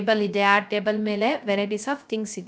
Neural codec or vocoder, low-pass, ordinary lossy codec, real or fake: codec, 16 kHz, 0.3 kbps, FocalCodec; none; none; fake